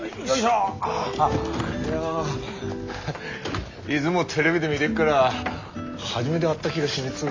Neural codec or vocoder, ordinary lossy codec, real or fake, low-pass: none; MP3, 64 kbps; real; 7.2 kHz